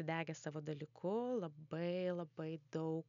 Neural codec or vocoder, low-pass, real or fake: none; 7.2 kHz; real